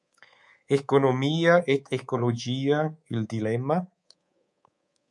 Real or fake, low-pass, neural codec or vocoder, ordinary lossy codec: fake; 10.8 kHz; codec, 24 kHz, 3.1 kbps, DualCodec; MP3, 64 kbps